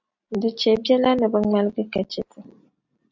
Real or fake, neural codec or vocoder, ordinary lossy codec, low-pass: real; none; AAC, 48 kbps; 7.2 kHz